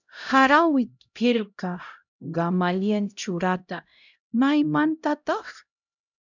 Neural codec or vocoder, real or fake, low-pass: codec, 16 kHz, 0.5 kbps, X-Codec, HuBERT features, trained on LibriSpeech; fake; 7.2 kHz